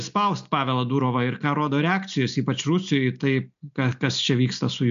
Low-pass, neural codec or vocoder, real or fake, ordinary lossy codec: 7.2 kHz; none; real; MP3, 64 kbps